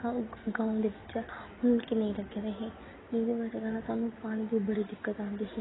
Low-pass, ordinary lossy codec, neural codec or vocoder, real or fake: 7.2 kHz; AAC, 16 kbps; none; real